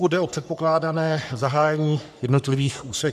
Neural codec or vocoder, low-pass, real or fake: codec, 44.1 kHz, 3.4 kbps, Pupu-Codec; 14.4 kHz; fake